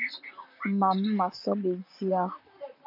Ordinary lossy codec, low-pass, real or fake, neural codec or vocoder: MP3, 48 kbps; 5.4 kHz; fake; vocoder, 44.1 kHz, 128 mel bands, Pupu-Vocoder